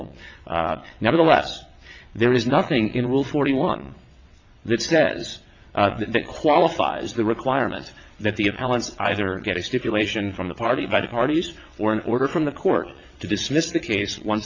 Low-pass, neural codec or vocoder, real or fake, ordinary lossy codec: 7.2 kHz; vocoder, 22.05 kHz, 80 mel bands, WaveNeXt; fake; MP3, 48 kbps